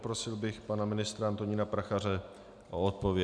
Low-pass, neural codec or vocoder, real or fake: 9.9 kHz; none; real